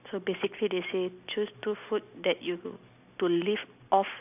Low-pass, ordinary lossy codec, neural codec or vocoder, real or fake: 3.6 kHz; none; none; real